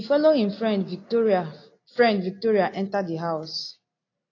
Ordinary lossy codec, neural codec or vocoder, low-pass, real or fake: AAC, 32 kbps; none; 7.2 kHz; real